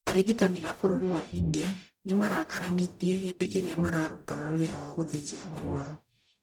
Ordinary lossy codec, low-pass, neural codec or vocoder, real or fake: none; 19.8 kHz; codec, 44.1 kHz, 0.9 kbps, DAC; fake